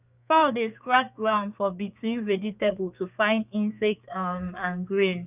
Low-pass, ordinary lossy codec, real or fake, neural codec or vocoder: 3.6 kHz; none; fake; codec, 32 kHz, 1.9 kbps, SNAC